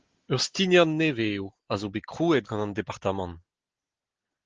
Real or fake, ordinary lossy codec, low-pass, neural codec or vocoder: real; Opus, 16 kbps; 7.2 kHz; none